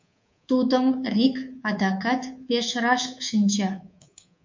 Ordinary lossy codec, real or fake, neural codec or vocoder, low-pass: MP3, 48 kbps; fake; codec, 24 kHz, 3.1 kbps, DualCodec; 7.2 kHz